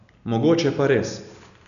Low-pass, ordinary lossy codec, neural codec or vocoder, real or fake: 7.2 kHz; none; none; real